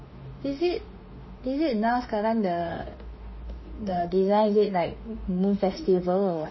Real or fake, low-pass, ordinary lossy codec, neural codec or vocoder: fake; 7.2 kHz; MP3, 24 kbps; autoencoder, 48 kHz, 32 numbers a frame, DAC-VAE, trained on Japanese speech